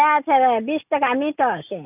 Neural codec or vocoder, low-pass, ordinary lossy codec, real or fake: none; 3.6 kHz; none; real